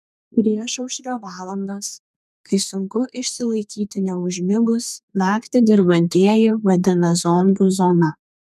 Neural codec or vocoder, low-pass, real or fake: codec, 32 kHz, 1.9 kbps, SNAC; 14.4 kHz; fake